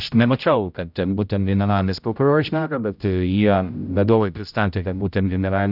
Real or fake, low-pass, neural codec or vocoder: fake; 5.4 kHz; codec, 16 kHz, 0.5 kbps, X-Codec, HuBERT features, trained on general audio